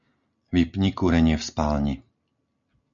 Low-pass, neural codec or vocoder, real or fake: 7.2 kHz; none; real